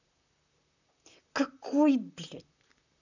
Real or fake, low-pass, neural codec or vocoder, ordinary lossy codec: fake; 7.2 kHz; vocoder, 44.1 kHz, 128 mel bands, Pupu-Vocoder; none